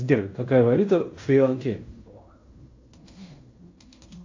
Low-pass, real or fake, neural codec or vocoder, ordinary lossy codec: 7.2 kHz; fake; codec, 16 kHz in and 24 kHz out, 0.9 kbps, LongCat-Audio-Codec, fine tuned four codebook decoder; AAC, 48 kbps